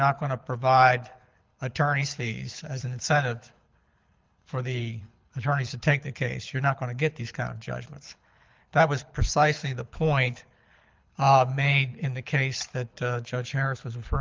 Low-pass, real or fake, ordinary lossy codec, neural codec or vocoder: 7.2 kHz; fake; Opus, 32 kbps; codec, 24 kHz, 6 kbps, HILCodec